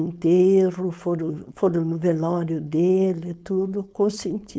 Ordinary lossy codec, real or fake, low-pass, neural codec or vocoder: none; fake; none; codec, 16 kHz, 4.8 kbps, FACodec